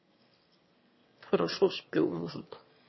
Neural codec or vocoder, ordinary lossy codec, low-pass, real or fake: autoencoder, 22.05 kHz, a latent of 192 numbers a frame, VITS, trained on one speaker; MP3, 24 kbps; 7.2 kHz; fake